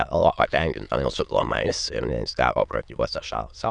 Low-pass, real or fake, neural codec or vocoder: 9.9 kHz; fake; autoencoder, 22.05 kHz, a latent of 192 numbers a frame, VITS, trained on many speakers